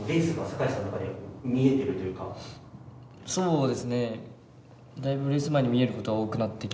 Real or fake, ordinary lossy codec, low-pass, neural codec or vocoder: real; none; none; none